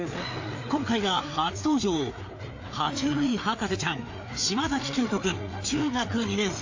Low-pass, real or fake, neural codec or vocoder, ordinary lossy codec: 7.2 kHz; fake; codec, 16 kHz, 4 kbps, FreqCodec, larger model; AAC, 48 kbps